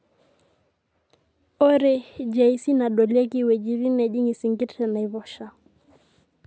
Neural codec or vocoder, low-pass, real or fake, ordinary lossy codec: none; none; real; none